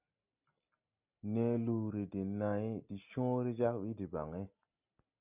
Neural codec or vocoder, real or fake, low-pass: none; real; 3.6 kHz